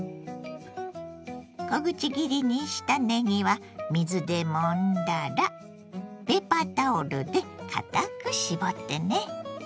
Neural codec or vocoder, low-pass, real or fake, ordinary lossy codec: none; none; real; none